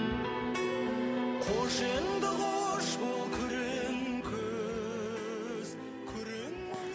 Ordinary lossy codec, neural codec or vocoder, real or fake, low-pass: none; none; real; none